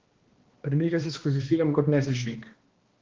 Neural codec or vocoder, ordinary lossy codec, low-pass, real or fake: codec, 16 kHz, 1 kbps, X-Codec, HuBERT features, trained on balanced general audio; Opus, 16 kbps; 7.2 kHz; fake